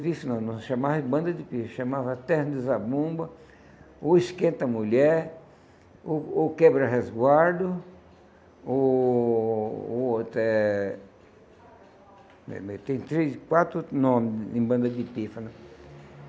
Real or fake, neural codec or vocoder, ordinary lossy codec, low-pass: real; none; none; none